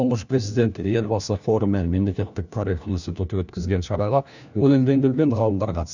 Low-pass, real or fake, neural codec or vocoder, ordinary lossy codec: 7.2 kHz; fake; codec, 16 kHz, 1 kbps, FunCodec, trained on LibriTTS, 50 frames a second; none